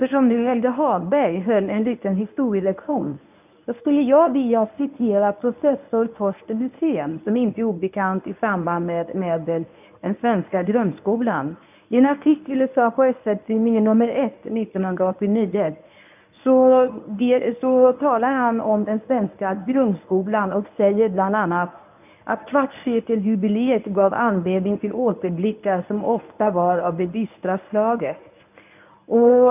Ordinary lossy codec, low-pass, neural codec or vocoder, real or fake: none; 3.6 kHz; codec, 24 kHz, 0.9 kbps, WavTokenizer, medium speech release version 1; fake